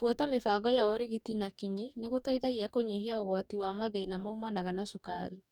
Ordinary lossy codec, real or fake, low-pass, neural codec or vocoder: none; fake; 19.8 kHz; codec, 44.1 kHz, 2.6 kbps, DAC